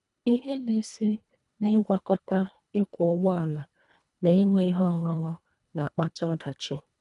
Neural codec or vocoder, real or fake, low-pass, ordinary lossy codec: codec, 24 kHz, 1.5 kbps, HILCodec; fake; 10.8 kHz; none